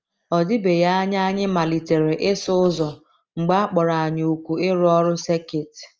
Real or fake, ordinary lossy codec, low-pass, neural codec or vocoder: real; Opus, 24 kbps; 7.2 kHz; none